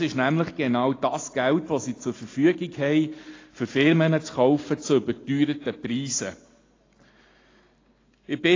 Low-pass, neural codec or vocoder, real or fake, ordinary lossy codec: 7.2 kHz; none; real; AAC, 32 kbps